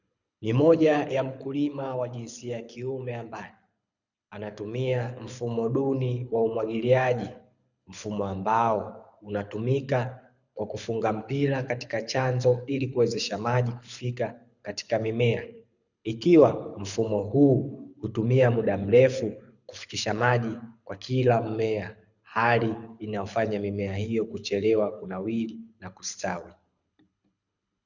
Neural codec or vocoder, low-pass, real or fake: codec, 24 kHz, 6 kbps, HILCodec; 7.2 kHz; fake